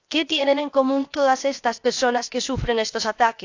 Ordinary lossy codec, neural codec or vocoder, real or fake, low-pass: AAC, 48 kbps; codec, 16 kHz, 0.7 kbps, FocalCodec; fake; 7.2 kHz